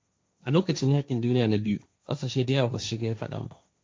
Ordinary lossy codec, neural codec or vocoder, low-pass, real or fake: none; codec, 16 kHz, 1.1 kbps, Voila-Tokenizer; none; fake